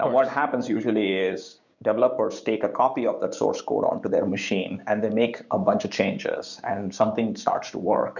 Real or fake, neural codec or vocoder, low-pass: fake; codec, 16 kHz, 6 kbps, DAC; 7.2 kHz